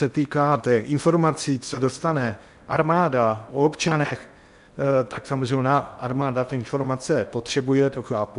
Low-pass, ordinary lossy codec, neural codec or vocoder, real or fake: 10.8 kHz; MP3, 96 kbps; codec, 16 kHz in and 24 kHz out, 0.8 kbps, FocalCodec, streaming, 65536 codes; fake